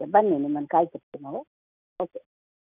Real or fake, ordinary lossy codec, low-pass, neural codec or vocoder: real; none; 3.6 kHz; none